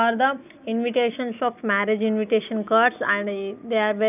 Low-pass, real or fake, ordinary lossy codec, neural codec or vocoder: 3.6 kHz; real; none; none